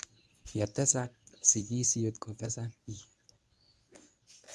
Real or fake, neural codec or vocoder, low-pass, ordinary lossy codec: fake; codec, 24 kHz, 0.9 kbps, WavTokenizer, medium speech release version 1; none; none